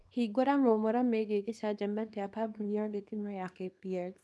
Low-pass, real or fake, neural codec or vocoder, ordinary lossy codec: none; fake; codec, 24 kHz, 0.9 kbps, WavTokenizer, small release; none